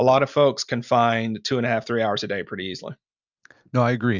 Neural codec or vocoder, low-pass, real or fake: none; 7.2 kHz; real